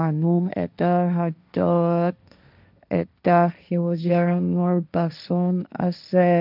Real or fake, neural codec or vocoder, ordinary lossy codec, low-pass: fake; codec, 16 kHz, 1.1 kbps, Voila-Tokenizer; none; 5.4 kHz